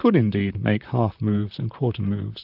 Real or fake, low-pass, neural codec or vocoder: fake; 5.4 kHz; vocoder, 44.1 kHz, 128 mel bands, Pupu-Vocoder